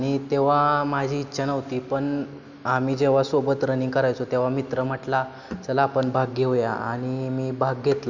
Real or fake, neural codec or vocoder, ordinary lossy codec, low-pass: real; none; none; 7.2 kHz